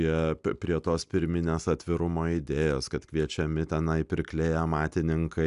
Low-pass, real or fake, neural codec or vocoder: 10.8 kHz; real; none